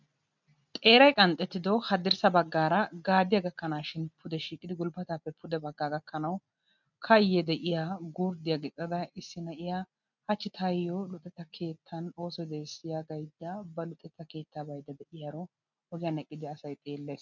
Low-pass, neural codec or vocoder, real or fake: 7.2 kHz; none; real